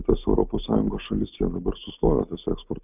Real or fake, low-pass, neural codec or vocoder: real; 3.6 kHz; none